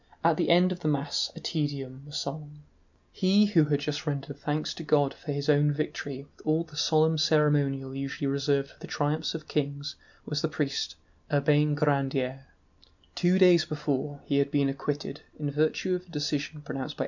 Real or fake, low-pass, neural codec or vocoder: real; 7.2 kHz; none